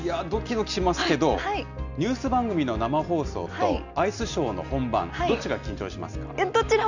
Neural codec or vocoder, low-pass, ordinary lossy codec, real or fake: none; 7.2 kHz; none; real